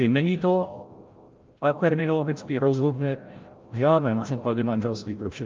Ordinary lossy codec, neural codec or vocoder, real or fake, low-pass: Opus, 32 kbps; codec, 16 kHz, 0.5 kbps, FreqCodec, larger model; fake; 7.2 kHz